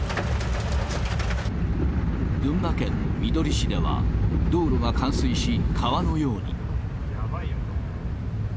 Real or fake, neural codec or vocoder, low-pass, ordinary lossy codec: real; none; none; none